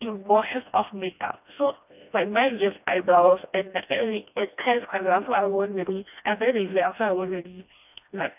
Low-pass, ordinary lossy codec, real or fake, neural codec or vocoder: 3.6 kHz; none; fake; codec, 16 kHz, 1 kbps, FreqCodec, smaller model